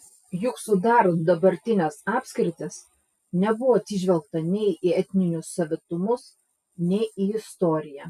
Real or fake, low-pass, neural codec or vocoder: real; 14.4 kHz; none